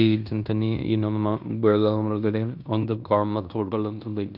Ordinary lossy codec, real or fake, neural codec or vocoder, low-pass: none; fake; codec, 16 kHz in and 24 kHz out, 0.9 kbps, LongCat-Audio-Codec, fine tuned four codebook decoder; 5.4 kHz